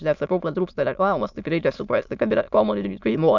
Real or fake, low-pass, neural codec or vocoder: fake; 7.2 kHz; autoencoder, 22.05 kHz, a latent of 192 numbers a frame, VITS, trained on many speakers